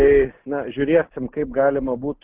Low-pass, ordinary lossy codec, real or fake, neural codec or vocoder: 3.6 kHz; Opus, 16 kbps; real; none